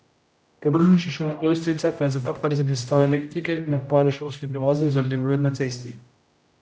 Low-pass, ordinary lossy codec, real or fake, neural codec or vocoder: none; none; fake; codec, 16 kHz, 0.5 kbps, X-Codec, HuBERT features, trained on general audio